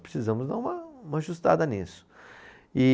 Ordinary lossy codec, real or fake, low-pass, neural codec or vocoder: none; real; none; none